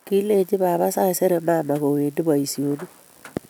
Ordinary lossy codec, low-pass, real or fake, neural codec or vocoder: none; none; real; none